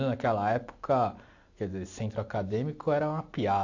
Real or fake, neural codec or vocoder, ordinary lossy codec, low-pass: real; none; AAC, 48 kbps; 7.2 kHz